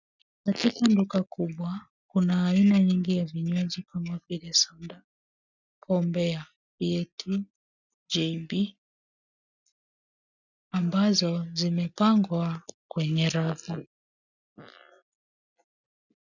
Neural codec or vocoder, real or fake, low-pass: none; real; 7.2 kHz